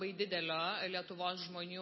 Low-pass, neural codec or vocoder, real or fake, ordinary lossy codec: 7.2 kHz; none; real; MP3, 24 kbps